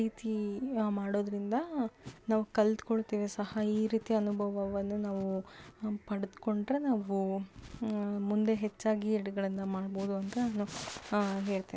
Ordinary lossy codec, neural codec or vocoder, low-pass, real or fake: none; none; none; real